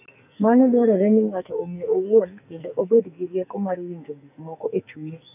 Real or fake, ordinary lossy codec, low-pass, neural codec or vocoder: fake; none; 3.6 kHz; codec, 44.1 kHz, 2.6 kbps, SNAC